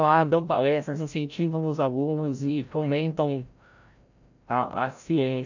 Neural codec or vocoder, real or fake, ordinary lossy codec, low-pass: codec, 16 kHz, 0.5 kbps, FreqCodec, larger model; fake; none; 7.2 kHz